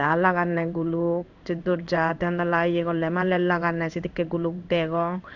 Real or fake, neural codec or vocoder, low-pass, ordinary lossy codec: fake; codec, 16 kHz in and 24 kHz out, 1 kbps, XY-Tokenizer; 7.2 kHz; none